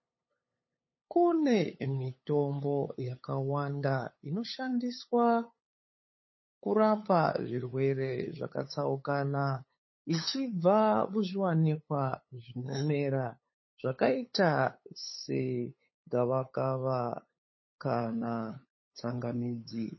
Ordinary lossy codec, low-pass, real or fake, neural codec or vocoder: MP3, 24 kbps; 7.2 kHz; fake; codec, 16 kHz, 8 kbps, FunCodec, trained on LibriTTS, 25 frames a second